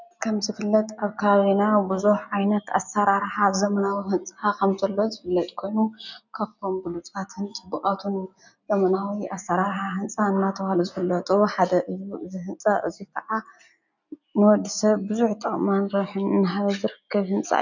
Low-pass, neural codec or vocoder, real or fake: 7.2 kHz; none; real